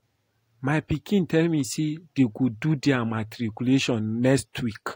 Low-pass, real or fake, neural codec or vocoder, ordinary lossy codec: 19.8 kHz; real; none; AAC, 48 kbps